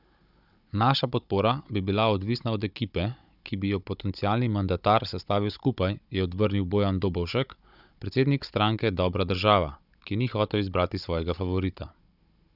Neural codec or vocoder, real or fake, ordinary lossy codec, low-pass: codec, 16 kHz, 16 kbps, FunCodec, trained on Chinese and English, 50 frames a second; fake; none; 5.4 kHz